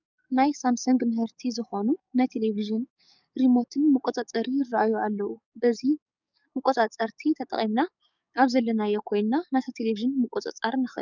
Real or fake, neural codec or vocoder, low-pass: fake; codec, 44.1 kHz, 7.8 kbps, DAC; 7.2 kHz